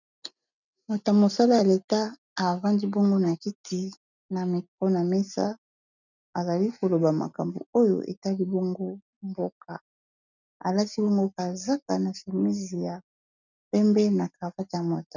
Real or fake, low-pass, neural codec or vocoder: real; 7.2 kHz; none